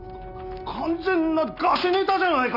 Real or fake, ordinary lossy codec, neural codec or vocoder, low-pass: real; none; none; 5.4 kHz